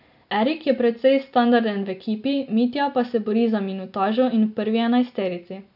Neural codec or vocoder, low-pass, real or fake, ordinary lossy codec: none; 5.4 kHz; real; none